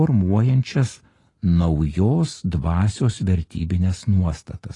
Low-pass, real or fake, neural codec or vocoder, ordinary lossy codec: 10.8 kHz; real; none; AAC, 32 kbps